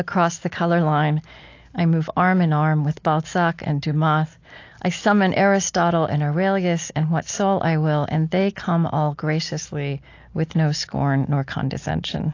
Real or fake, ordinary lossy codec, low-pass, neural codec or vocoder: real; AAC, 48 kbps; 7.2 kHz; none